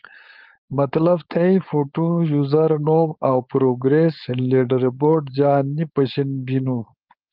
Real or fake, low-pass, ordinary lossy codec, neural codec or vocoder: fake; 5.4 kHz; Opus, 24 kbps; codec, 16 kHz, 4.8 kbps, FACodec